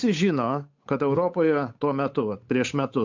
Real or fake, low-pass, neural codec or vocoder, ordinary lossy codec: fake; 7.2 kHz; codec, 16 kHz, 8 kbps, FunCodec, trained on Chinese and English, 25 frames a second; MP3, 64 kbps